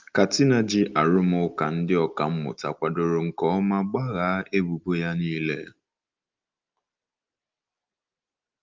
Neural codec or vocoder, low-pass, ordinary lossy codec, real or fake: none; 7.2 kHz; Opus, 24 kbps; real